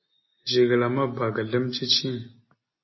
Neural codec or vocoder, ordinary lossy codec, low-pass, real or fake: none; MP3, 24 kbps; 7.2 kHz; real